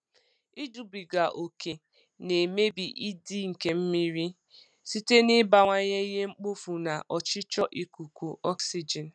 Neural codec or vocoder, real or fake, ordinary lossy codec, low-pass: none; real; none; 9.9 kHz